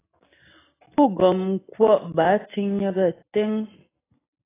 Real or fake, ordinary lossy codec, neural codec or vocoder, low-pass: real; AAC, 16 kbps; none; 3.6 kHz